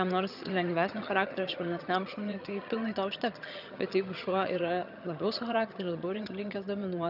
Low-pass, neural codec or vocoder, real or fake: 5.4 kHz; vocoder, 22.05 kHz, 80 mel bands, HiFi-GAN; fake